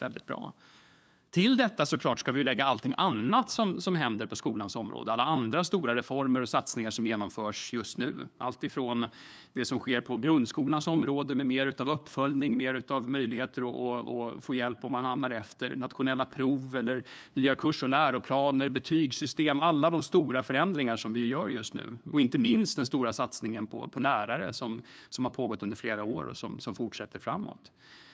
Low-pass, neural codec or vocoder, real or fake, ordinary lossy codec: none; codec, 16 kHz, 2 kbps, FunCodec, trained on LibriTTS, 25 frames a second; fake; none